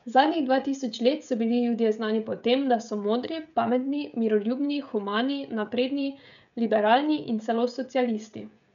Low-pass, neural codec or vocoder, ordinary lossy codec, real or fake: 7.2 kHz; codec, 16 kHz, 16 kbps, FreqCodec, smaller model; none; fake